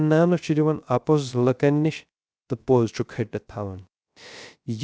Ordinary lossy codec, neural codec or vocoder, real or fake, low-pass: none; codec, 16 kHz, 0.3 kbps, FocalCodec; fake; none